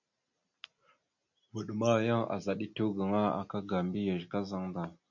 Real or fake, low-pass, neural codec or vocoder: real; 7.2 kHz; none